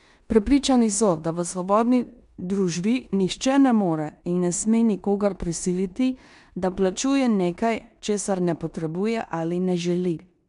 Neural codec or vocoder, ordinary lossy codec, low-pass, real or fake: codec, 16 kHz in and 24 kHz out, 0.9 kbps, LongCat-Audio-Codec, four codebook decoder; none; 10.8 kHz; fake